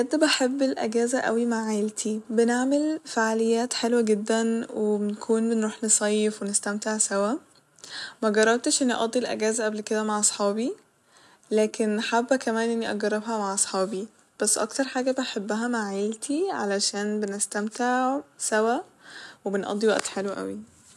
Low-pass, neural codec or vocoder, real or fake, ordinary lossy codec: none; none; real; none